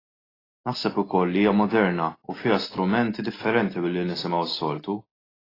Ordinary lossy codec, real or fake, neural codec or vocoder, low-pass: AAC, 24 kbps; real; none; 5.4 kHz